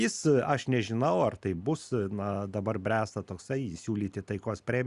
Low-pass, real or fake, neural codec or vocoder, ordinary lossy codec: 10.8 kHz; real; none; Opus, 64 kbps